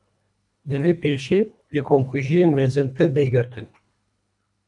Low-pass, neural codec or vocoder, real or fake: 10.8 kHz; codec, 24 kHz, 1.5 kbps, HILCodec; fake